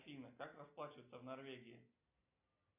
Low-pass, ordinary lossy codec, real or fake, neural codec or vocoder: 3.6 kHz; AAC, 32 kbps; real; none